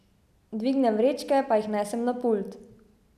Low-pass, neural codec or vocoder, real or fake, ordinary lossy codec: 14.4 kHz; none; real; none